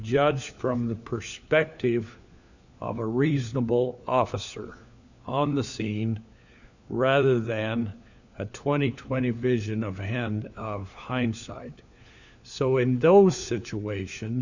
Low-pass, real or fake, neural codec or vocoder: 7.2 kHz; fake; codec, 16 kHz, 4 kbps, FunCodec, trained on LibriTTS, 50 frames a second